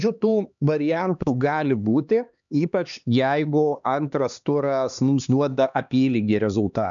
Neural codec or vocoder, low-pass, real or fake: codec, 16 kHz, 2 kbps, X-Codec, HuBERT features, trained on LibriSpeech; 7.2 kHz; fake